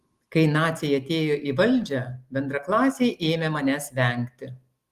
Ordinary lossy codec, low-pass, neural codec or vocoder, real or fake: Opus, 24 kbps; 14.4 kHz; none; real